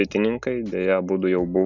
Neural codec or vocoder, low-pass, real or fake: none; 7.2 kHz; real